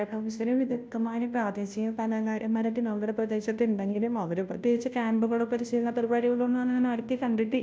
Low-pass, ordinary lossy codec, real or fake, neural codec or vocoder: none; none; fake; codec, 16 kHz, 0.5 kbps, FunCodec, trained on Chinese and English, 25 frames a second